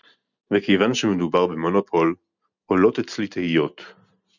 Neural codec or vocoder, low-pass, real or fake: none; 7.2 kHz; real